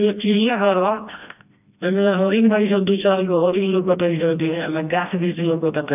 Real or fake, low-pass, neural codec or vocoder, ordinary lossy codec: fake; 3.6 kHz; codec, 16 kHz, 1 kbps, FreqCodec, smaller model; none